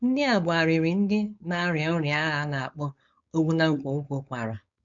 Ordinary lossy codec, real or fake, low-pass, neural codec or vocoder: MP3, 64 kbps; fake; 7.2 kHz; codec, 16 kHz, 4.8 kbps, FACodec